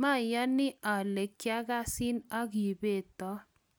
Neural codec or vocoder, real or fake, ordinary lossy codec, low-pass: none; real; none; none